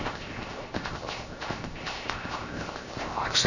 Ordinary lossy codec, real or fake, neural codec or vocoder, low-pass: none; fake; codec, 16 kHz, 0.7 kbps, FocalCodec; 7.2 kHz